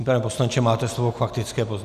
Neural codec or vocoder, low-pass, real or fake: none; 14.4 kHz; real